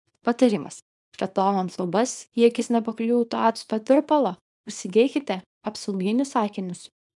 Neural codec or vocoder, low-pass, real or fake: codec, 24 kHz, 0.9 kbps, WavTokenizer, small release; 10.8 kHz; fake